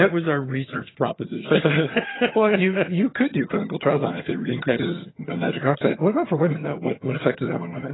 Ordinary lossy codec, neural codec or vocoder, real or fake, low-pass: AAC, 16 kbps; vocoder, 22.05 kHz, 80 mel bands, HiFi-GAN; fake; 7.2 kHz